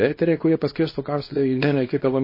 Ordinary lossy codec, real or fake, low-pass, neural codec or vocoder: MP3, 24 kbps; fake; 5.4 kHz; codec, 24 kHz, 0.9 kbps, WavTokenizer, small release